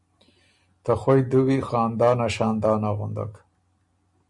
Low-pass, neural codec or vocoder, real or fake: 10.8 kHz; none; real